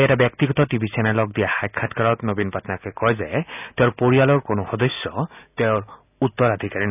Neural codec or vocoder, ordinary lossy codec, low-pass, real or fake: none; none; 3.6 kHz; real